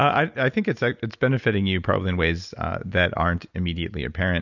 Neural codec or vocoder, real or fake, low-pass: none; real; 7.2 kHz